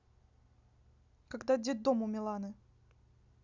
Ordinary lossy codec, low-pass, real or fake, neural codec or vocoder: none; 7.2 kHz; real; none